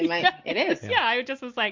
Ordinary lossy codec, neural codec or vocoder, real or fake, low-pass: MP3, 48 kbps; none; real; 7.2 kHz